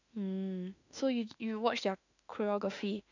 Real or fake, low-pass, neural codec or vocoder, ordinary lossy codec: fake; 7.2 kHz; autoencoder, 48 kHz, 32 numbers a frame, DAC-VAE, trained on Japanese speech; none